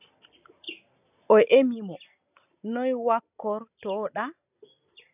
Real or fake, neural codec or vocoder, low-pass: real; none; 3.6 kHz